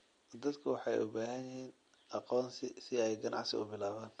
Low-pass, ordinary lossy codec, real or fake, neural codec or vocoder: 9.9 kHz; MP3, 48 kbps; real; none